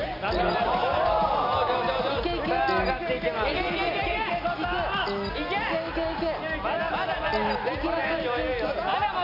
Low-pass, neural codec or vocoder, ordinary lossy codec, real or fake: 5.4 kHz; none; none; real